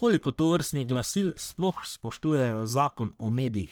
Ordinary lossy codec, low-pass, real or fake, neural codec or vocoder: none; none; fake; codec, 44.1 kHz, 1.7 kbps, Pupu-Codec